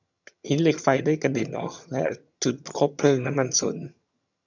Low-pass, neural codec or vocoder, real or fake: 7.2 kHz; vocoder, 22.05 kHz, 80 mel bands, HiFi-GAN; fake